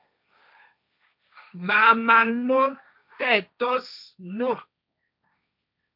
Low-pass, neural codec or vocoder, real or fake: 5.4 kHz; codec, 16 kHz, 1.1 kbps, Voila-Tokenizer; fake